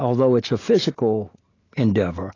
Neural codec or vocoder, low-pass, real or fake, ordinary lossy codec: none; 7.2 kHz; real; AAC, 32 kbps